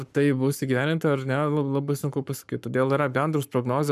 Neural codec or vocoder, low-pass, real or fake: codec, 44.1 kHz, 7.8 kbps, Pupu-Codec; 14.4 kHz; fake